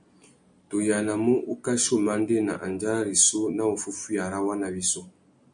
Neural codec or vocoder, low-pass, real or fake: none; 9.9 kHz; real